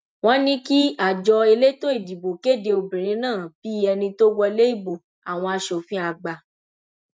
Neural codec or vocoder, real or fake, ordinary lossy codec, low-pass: none; real; none; none